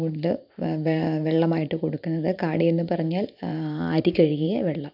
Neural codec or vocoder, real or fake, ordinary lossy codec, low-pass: vocoder, 44.1 kHz, 128 mel bands every 512 samples, BigVGAN v2; fake; none; 5.4 kHz